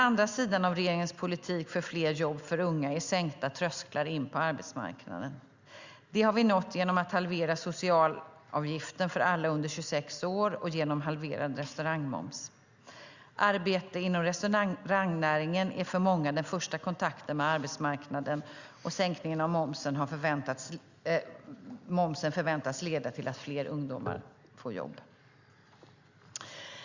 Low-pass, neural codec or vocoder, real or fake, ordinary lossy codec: 7.2 kHz; none; real; Opus, 64 kbps